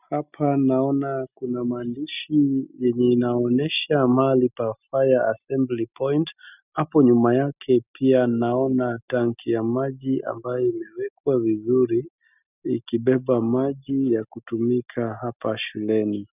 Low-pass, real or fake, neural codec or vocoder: 3.6 kHz; real; none